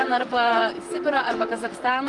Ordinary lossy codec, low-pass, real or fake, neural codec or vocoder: Opus, 32 kbps; 10.8 kHz; fake; vocoder, 44.1 kHz, 128 mel bands, Pupu-Vocoder